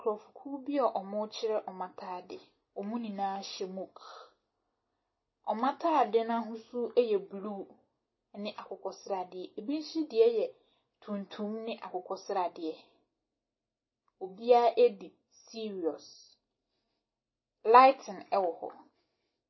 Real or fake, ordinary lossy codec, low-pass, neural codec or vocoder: real; MP3, 24 kbps; 7.2 kHz; none